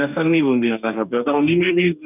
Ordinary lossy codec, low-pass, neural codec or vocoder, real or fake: none; 3.6 kHz; codec, 44.1 kHz, 2.6 kbps, DAC; fake